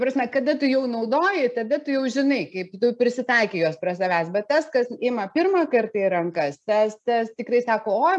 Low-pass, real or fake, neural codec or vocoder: 10.8 kHz; real; none